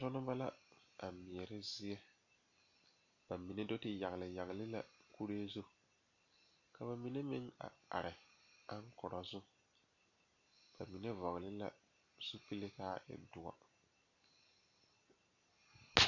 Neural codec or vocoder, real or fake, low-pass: none; real; 7.2 kHz